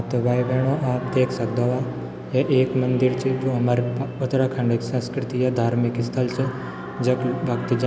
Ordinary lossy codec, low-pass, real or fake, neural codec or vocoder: none; none; real; none